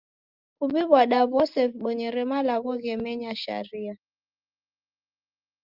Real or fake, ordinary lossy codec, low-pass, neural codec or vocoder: real; Opus, 32 kbps; 5.4 kHz; none